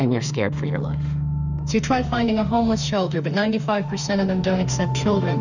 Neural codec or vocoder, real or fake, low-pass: autoencoder, 48 kHz, 32 numbers a frame, DAC-VAE, trained on Japanese speech; fake; 7.2 kHz